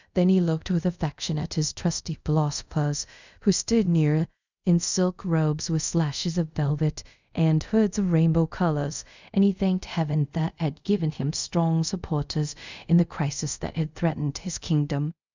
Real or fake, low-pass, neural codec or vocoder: fake; 7.2 kHz; codec, 24 kHz, 0.5 kbps, DualCodec